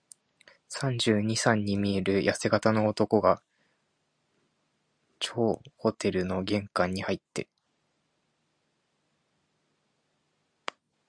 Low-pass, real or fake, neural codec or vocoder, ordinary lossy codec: 9.9 kHz; real; none; Opus, 64 kbps